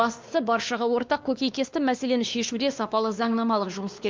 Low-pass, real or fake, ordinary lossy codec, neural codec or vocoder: 7.2 kHz; fake; Opus, 24 kbps; codec, 16 kHz, 2 kbps, X-Codec, WavLM features, trained on Multilingual LibriSpeech